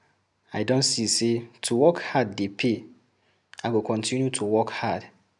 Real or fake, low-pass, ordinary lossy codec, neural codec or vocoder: real; 10.8 kHz; none; none